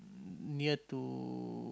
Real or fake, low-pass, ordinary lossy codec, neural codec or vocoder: real; none; none; none